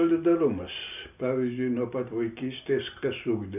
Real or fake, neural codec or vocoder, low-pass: real; none; 3.6 kHz